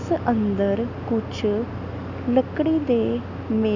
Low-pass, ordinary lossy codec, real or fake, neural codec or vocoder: 7.2 kHz; none; real; none